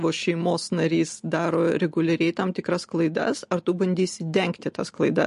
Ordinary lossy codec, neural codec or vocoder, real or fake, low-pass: MP3, 48 kbps; none; real; 10.8 kHz